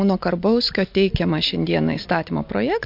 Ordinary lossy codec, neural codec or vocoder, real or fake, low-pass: MP3, 48 kbps; none; real; 5.4 kHz